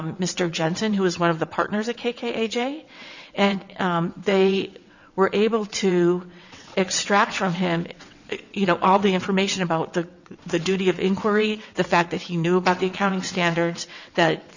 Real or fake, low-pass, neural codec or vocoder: fake; 7.2 kHz; vocoder, 22.05 kHz, 80 mel bands, WaveNeXt